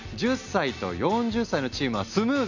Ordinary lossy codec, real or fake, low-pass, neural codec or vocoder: none; real; 7.2 kHz; none